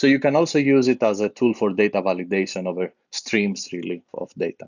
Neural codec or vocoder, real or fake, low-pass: none; real; 7.2 kHz